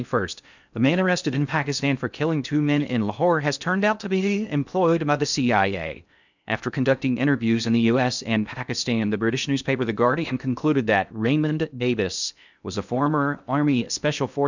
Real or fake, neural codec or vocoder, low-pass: fake; codec, 16 kHz in and 24 kHz out, 0.6 kbps, FocalCodec, streaming, 2048 codes; 7.2 kHz